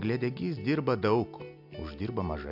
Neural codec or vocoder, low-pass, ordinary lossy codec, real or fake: none; 5.4 kHz; AAC, 48 kbps; real